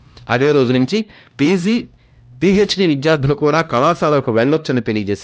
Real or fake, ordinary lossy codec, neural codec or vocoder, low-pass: fake; none; codec, 16 kHz, 1 kbps, X-Codec, HuBERT features, trained on LibriSpeech; none